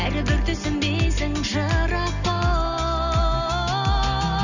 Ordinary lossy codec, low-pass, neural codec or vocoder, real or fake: none; 7.2 kHz; none; real